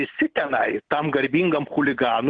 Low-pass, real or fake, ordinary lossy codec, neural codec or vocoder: 9.9 kHz; real; Opus, 16 kbps; none